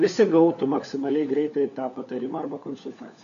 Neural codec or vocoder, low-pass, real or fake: codec, 16 kHz, 4 kbps, FunCodec, trained on LibriTTS, 50 frames a second; 7.2 kHz; fake